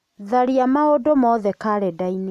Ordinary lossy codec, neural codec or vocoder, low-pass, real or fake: none; none; 14.4 kHz; real